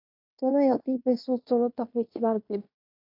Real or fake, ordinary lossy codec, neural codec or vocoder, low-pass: fake; AAC, 32 kbps; codec, 16 kHz in and 24 kHz out, 0.9 kbps, LongCat-Audio-Codec, fine tuned four codebook decoder; 5.4 kHz